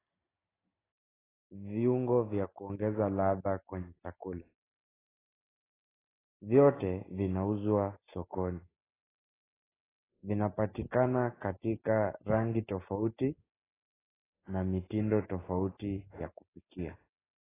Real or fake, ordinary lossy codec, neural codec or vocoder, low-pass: real; AAC, 16 kbps; none; 3.6 kHz